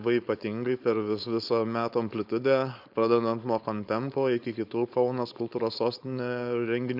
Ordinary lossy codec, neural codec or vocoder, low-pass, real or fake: MP3, 48 kbps; codec, 16 kHz, 4.8 kbps, FACodec; 5.4 kHz; fake